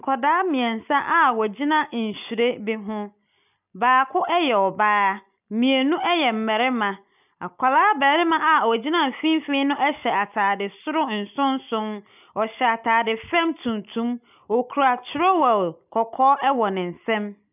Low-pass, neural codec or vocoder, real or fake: 3.6 kHz; none; real